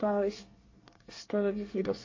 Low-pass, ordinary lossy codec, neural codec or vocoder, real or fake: 7.2 kHz; MP3, 32 kbps; codec, 24 kHz, 1 kbps, SNAC; fake